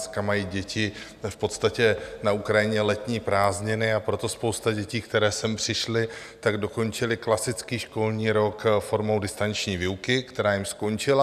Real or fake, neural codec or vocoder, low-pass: real; none; 14.4 kHz